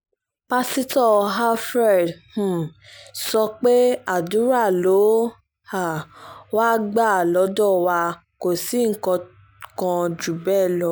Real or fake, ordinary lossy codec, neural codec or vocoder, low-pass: real; none; none; none